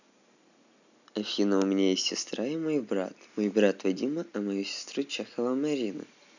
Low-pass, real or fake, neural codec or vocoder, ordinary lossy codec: 7.2 kHz; real; none; none